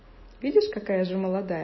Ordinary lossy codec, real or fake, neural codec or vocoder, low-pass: MP3, 24 kbps; real; none; 7.2 kHz